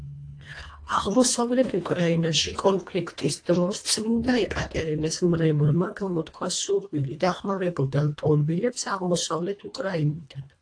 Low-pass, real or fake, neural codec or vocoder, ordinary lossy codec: 9.9 kHz; fake; codec, 24 kHz, 1.5 kbps, HILCodec; AAC, 48 kbps